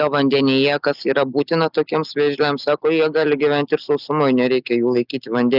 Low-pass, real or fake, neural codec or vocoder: 5.4 kHz; real; none